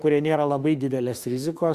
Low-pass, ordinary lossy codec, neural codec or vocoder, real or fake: 14.4 kHz; Opus, 64 kbps; autoencoder, 48 kHz, 32 numbers a frame, DAC-VAE, trained on Japanese speech; fake